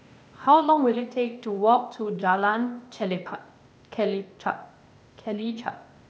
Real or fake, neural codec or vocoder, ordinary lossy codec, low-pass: fake; codec, 16 kHz, 0.8 kbps, ZipCodec; none; none